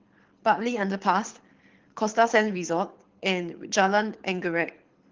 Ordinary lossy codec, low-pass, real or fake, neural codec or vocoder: Opus, 16 kbps; 7.2 kHz; fake; codec, 24 kHz, 6 kbps, HILCodec